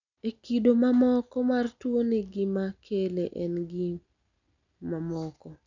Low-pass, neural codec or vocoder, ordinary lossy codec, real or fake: 7.2 kHz; none; none; real